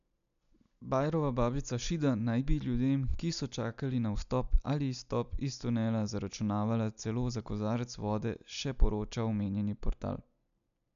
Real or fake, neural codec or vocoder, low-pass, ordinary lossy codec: real; none; 7.2 kHz; none